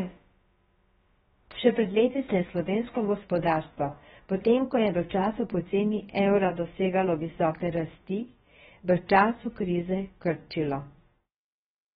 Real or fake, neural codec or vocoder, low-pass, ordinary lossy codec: fake; codec, 16 kHz, about 1 kbps, DyCAST, with the encoder's durations; 7.2 kHz; AAC, 16 kbps